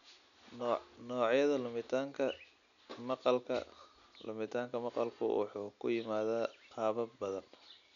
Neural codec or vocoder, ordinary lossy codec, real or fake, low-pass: none; none; real; 7.2 kHz